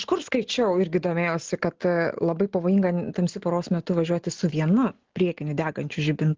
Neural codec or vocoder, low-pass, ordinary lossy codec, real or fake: none; 7.2 kHz; Opus, 16 kbps; real